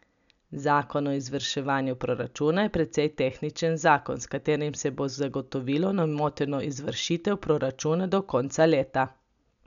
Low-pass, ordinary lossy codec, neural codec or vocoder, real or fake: 7.2 kHz; none; none; real